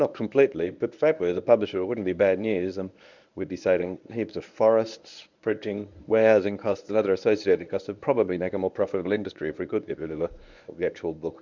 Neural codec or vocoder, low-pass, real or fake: codec, 24 kHz, 0.9 kbps, WavTokenizer, medium speech release version 1; 7.2 kHz; fake